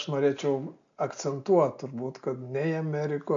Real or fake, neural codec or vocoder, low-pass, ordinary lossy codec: real; none; 7.2 kHz; MP3, 64 kbps